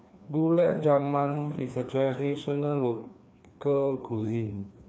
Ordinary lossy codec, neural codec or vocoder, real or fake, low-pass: none; codec, 16 kHz, 2 kbps, FreqCodec, larger model; fake; none